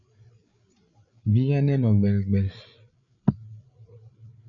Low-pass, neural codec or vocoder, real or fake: 7.2 kHz; codec, 16 kHz, 8 kbps, FreqCodec, larger model; fake